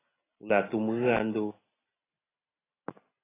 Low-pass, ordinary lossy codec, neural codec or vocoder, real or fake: 3.6 kHz; AAC, 16 kbps; none; real